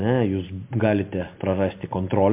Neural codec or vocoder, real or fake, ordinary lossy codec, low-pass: none; real; MP3, 32 kbps; 3.6 kHz